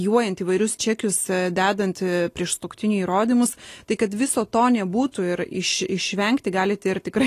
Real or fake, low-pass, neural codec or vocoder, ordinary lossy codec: real; 14.4 kHz; none; AAC, 48 kbps